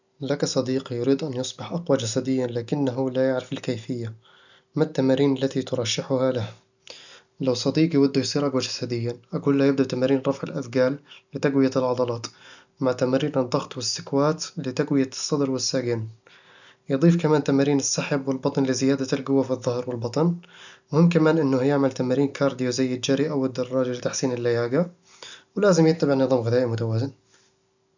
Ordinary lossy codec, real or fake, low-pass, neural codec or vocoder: none; real; 7.2 kHz; none